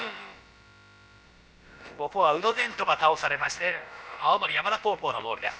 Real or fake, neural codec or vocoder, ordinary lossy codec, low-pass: fake; codec, 16 kHz, about 1 kbps, DyCAST, with the encoder's durations; none; none